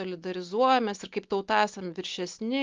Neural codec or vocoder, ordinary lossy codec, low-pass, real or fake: none; Opus, 32 kbps; 7.2 kHz; real